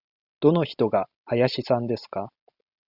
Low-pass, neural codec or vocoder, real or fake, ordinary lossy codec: 5.4 kHz; none; real; Opus, 64 kbps